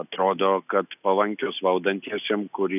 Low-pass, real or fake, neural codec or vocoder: 3.6 kHz; real; none